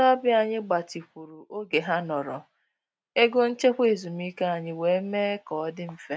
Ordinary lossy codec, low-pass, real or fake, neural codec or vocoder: none; none; real; none